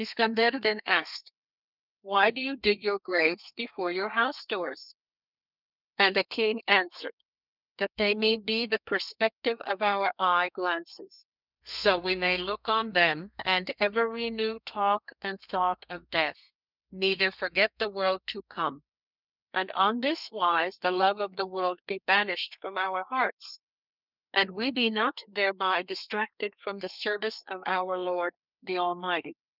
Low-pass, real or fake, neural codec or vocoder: 5.4 kHz; fake; codec, 32 kHz, 1.9 kbps, SNAC